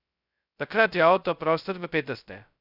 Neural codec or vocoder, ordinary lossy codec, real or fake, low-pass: codec, 16 kHz, 0.2 kbps, FocalCodec; none; fake; 5.4 kHz